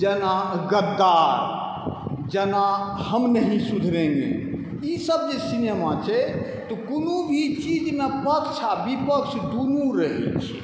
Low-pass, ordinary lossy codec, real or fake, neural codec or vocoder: none; none; real; none